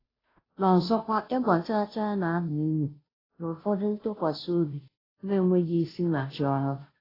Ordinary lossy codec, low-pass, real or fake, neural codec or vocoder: AAC, 24 kbps; 5.4 kHz; fake; codec, 16 kHz, 0.5 kbps, FunCodec, trained on Chinese and English, 25 frames a second